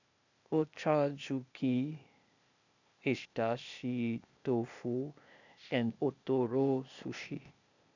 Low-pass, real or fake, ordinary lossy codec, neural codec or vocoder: 7.2 kHz; fake; none; codec, 16 kHz, 0.8 kbps, ZipCodec